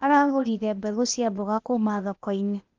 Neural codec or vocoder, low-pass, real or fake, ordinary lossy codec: codec, 16 kHz, 0.8 kbps, ZipCodec; 7.2 kHz; fake; Opus, 32 kbps